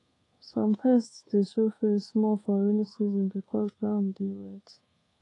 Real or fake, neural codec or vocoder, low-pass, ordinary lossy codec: fake; codec, 24 kHz, 1.2 kbps, DualCodec; 10.8 kHz; AAC, 32 kbps